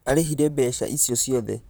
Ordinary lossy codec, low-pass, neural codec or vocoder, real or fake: none; none; vocoder, 44.1 kHz, 128 mel bands, Pupu-Vocoder; fake